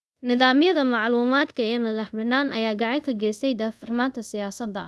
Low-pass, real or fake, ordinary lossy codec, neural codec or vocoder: none; fake; none; codec, 24 kHz, 0.5 kbps, DualCodec